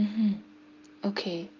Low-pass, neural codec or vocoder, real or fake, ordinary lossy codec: 7.2 kHz; none; real; Opus, 24 kbps